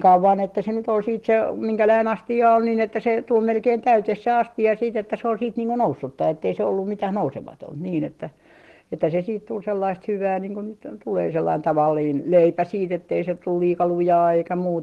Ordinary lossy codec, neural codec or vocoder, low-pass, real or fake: Opus, 16 kbps; none; 14.4 kHz; real